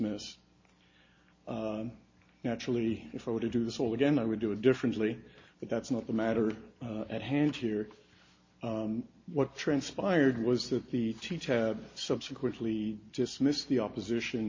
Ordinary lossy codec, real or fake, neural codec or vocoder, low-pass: MP3, 32 kbps; real; none; 7.2 kHz